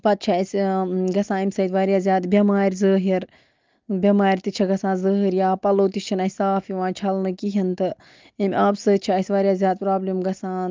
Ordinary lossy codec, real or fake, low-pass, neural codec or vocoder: Opus, 32 kbps; real; 7.2 kHz; none